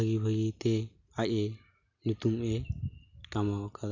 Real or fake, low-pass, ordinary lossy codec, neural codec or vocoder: real; 7.2 kHz; Opus, 64 kbps; none